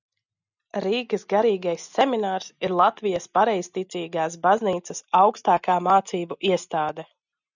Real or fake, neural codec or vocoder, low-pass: real; none; 7.2 kHz